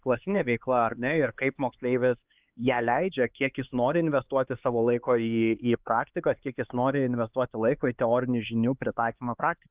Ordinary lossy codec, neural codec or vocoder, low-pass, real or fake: Opus, 32 kbps; codec, 16 kHz, 4 kbps, X-Codec, HuBERT features, trained on LibriSpeech; 3.6 kHz; fake